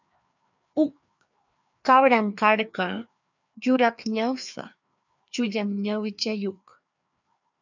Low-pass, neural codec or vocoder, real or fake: 7.2 kHz; codec, 16 kHz, 2 kbps, FreqCodec, larger model; fake